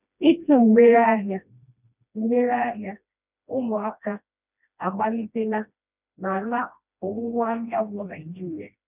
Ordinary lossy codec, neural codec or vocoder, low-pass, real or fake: none; codec, 16 kHz, 1 kbps, FreqCodec, smaller model; 3.6 kHz; fake